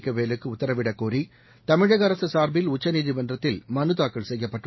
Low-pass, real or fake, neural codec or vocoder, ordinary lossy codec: 7.2 kHz; real; none; MP3, 24 kbps